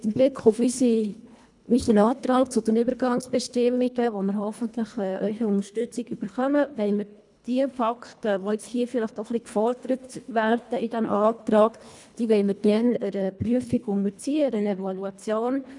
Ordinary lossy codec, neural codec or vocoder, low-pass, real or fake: none; codec, 24 kHz, 1.5 kbps, HILCodec; 10.8 kHz; fake